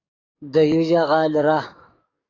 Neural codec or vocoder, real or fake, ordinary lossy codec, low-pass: codec, 44.1 kHz, 7.8 kbps, DAC; fake; AAC, 32 kbps; 7.2 kHz